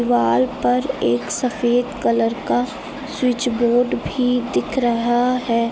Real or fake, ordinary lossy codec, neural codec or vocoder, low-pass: real; none; none; none